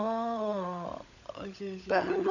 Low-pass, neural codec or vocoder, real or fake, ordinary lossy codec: 7.2 kHz; codec, 16 kHz, 16 kbps, FunCodec, trained on LibriTTS, 50 frames a second; fake; none